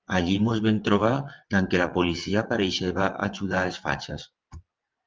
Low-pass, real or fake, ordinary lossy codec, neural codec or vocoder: 7.2 kHz; fake; Opus, 24 kbps; vocoder, 22.05 kHz, 80 mel bands, WaveNeXt